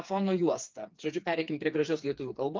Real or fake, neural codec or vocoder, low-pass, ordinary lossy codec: fake; codec, 16 kHz, 4 kbps, FreqCodec, smaller model; 7.2 kHz; Opus, 24 kbps